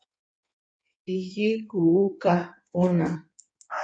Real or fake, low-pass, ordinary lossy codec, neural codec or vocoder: fake; 9.9 kHz; MP3, 96 kbps; codec, 16 kHz in and 24 kHz out, 1.1 kbps, FireRedTTS-2 codec